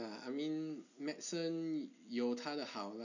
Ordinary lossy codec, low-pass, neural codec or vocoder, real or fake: none; 7.2 kHz; none; real